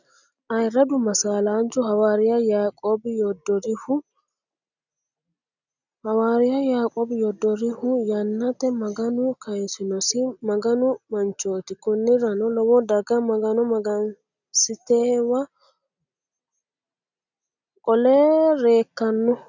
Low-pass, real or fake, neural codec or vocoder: 7.2 kHz; real; none